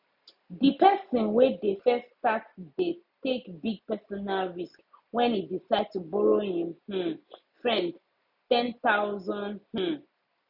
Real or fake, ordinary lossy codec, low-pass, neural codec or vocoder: real; none; 5.4 kHz; none